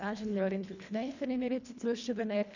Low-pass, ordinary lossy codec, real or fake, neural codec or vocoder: 7.2 kHz; none; fake; codec, 24 kHz, 1.5 kbps, HILCodec